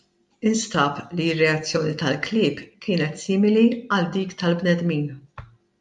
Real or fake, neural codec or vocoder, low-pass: real; none; 10.8 kHz